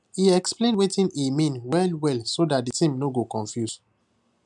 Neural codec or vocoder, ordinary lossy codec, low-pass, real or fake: none; MP3, 96 kbps; 10.8 kHz; real